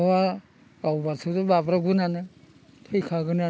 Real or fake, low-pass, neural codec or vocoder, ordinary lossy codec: real; none; none; none